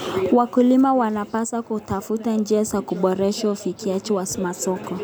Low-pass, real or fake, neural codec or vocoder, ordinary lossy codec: none; real; none; none